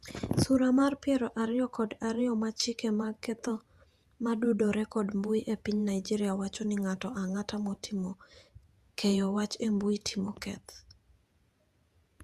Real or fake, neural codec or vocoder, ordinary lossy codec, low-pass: fake; vocoder, 48 kHz, 128 mel bands, Vocos; Opus, 64 kbps; 14.4 kHz